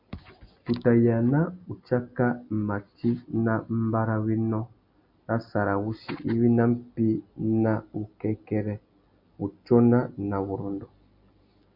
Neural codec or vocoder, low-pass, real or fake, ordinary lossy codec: none; 5.4 kHz; real; MP3, 48 kbps